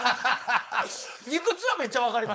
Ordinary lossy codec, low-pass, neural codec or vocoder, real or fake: none; none; codec, 16 kHz, 4.8 kbps, FACodec; fake